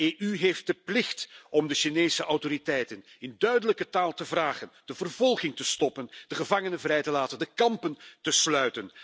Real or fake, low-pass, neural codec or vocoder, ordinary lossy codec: real; none; none; none